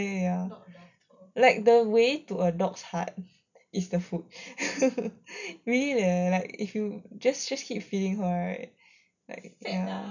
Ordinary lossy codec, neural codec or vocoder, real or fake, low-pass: none; none; real; 7.2 kHz